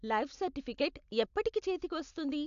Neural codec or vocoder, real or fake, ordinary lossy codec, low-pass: none; real; AAC, 96 kbps; 7.2 kHz